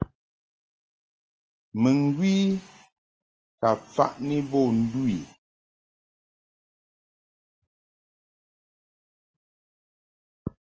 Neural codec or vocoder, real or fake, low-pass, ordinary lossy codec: none; real; 7.2 kHz; Opus, 24 kbps